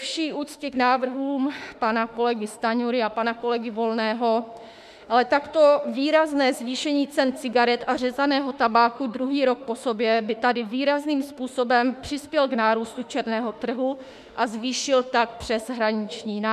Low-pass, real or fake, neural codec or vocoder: 14.4 kHz; fake; autoencoder, 48 kHz, 32 numbers a frame, DAC-VAE, trained on Japanese speech